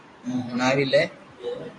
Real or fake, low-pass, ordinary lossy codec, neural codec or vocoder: fake; 10.8 kHz; AAC, 48 kbps; vocoder, 44.1 kHz, 128 mel bands every 512 samples, BigVGAN v2